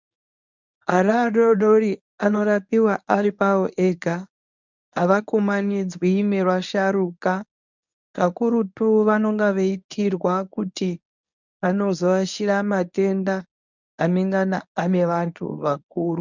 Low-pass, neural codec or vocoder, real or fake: 7.2 kHz; codec, 24 kHz, 0.9 kbps, WavTokenizer, medium speech release version 1; fake